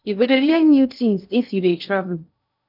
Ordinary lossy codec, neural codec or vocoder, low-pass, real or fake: none; codec, 16 kHz in and 24 kHz out, 0.6 kbps, FocalCodec, streaming, 2048 codes; 5.4 kHz; fake